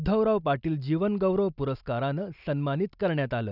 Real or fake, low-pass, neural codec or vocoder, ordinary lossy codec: real; 5.4 kHz; none; none